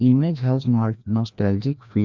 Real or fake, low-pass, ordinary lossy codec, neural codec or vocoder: fake; 7.2 kHz; MP3, 64 kbps; codec, 16 kHz, 1 kbps, FreqCodec, larger model